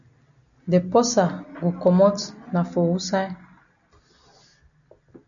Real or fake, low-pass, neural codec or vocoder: real; 7.2 kHz; none